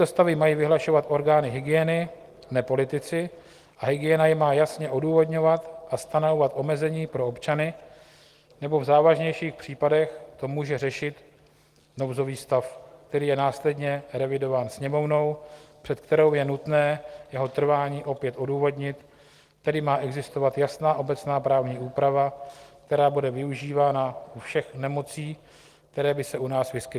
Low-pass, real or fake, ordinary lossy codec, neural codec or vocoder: 14.4 kHz; real; Opus, 24 kbps; none